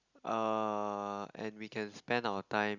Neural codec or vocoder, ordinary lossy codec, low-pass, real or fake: none; Opus, 64 kbps; 7.2 kHz; real